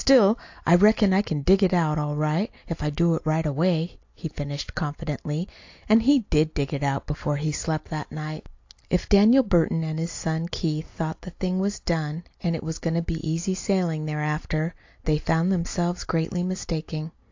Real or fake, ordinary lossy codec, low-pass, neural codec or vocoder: real; AAC, 48 kbps; 7.2 kHz; none